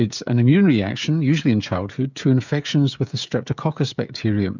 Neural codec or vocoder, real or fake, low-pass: codec, 16 kHz, 16 kbps, FreqCodec, smaller model; fake; 7.2 kHz